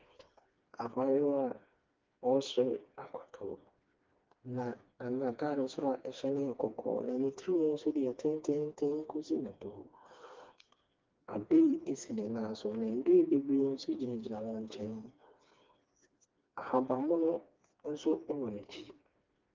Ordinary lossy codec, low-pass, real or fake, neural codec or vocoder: Opus, 32 kbps; 7.2 kHz; fake; codec, 16 kHz, 2 kbps, FreqCodec, smaller model